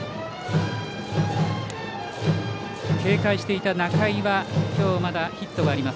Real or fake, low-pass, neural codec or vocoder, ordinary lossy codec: real; none; none; none